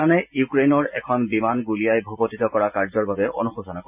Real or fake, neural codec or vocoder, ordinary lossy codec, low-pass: real; none; none; 3.6 kHz